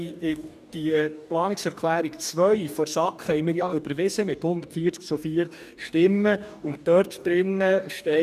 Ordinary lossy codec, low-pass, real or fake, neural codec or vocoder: none; 14.4 kHz; fake; codec, 44.1 kHz, 2.6 kbps, DAC